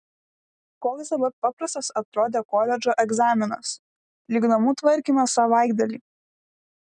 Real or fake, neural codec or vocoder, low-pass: real; none; 9.9 kHz